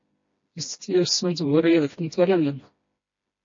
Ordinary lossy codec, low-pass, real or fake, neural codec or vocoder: MP3, 32 kbps; 7.2 kHz; fake; codec, 16 kHz, 1 kbps, FreqCodec, smaller model